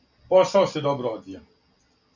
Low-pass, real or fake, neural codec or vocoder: 7.2 kHz; real; none